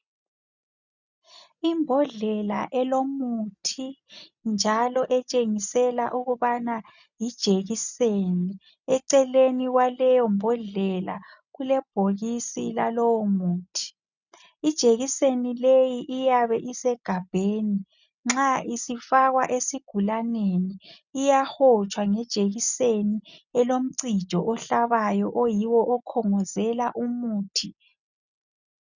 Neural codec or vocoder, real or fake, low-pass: none; real; 7.2 kHz